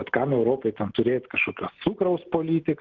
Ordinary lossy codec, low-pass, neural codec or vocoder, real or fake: Opus, 16 kbps; 7.2 kHz; none; real